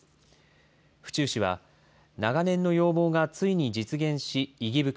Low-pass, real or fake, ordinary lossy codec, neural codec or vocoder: none; real; none; none